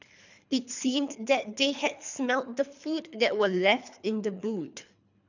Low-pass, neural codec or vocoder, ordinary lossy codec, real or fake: 7.2 kHz; codec, 24 kHz, 3 kbps, HILCodec; none; fake